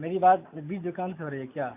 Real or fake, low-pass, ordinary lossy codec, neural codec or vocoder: real; 3.6 kHz; MP3, 32 kbps; none